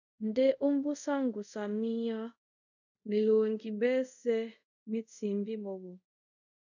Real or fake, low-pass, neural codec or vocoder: fake; 7.2 kHz; codec, 24 kHz, 0.5 kbps, DualCodec